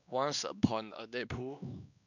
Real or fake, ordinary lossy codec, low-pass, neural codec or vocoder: fake; none; 7.2 kHz; codec, 16 kHz, 2 kbps, X-Codec, WavLM features, trained on Multilingual LibriSpeech